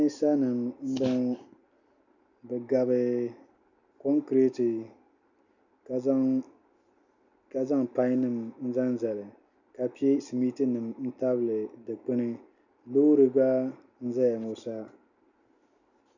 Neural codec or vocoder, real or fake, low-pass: none; real; 7.2 kHz